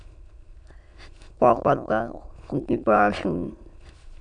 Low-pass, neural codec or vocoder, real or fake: 9.9 kHz; autoencoder, 22.05 kHz, a latent of 192 numbers a frame, VITS, trained on many speakers; fake